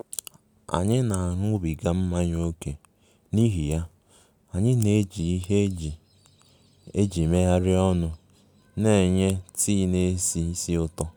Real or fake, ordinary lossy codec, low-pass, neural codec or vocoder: real; none; none; none